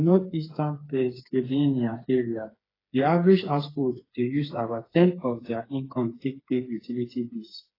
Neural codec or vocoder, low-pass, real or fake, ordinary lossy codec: codec, 16 kHz, 4 kbps, FreqCodec, smaller model; 5.4 kHz; fake; AAC, 24 kbps